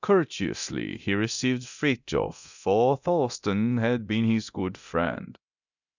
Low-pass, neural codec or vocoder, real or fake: 7.2 kHz; codec, 24 kHz, 0.9 kbps, DualCodec; fake